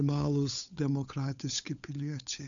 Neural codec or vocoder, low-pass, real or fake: codec, 16 kHz, 8 kbps, FunCodec, trained on Chinese and English, 25 frames a second; 7.2 kHz; fake